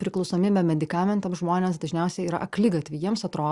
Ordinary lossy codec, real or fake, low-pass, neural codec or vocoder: Opus, 64 kbps; real; 10.8 kHz; none